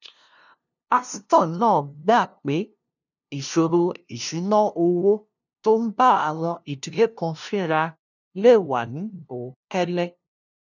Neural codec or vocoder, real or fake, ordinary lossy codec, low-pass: codec, 16 kHz, 0.5 kbps, FunCodec, trained on LibriTTS, 25 frames a second; fake; none; 7.2 kHz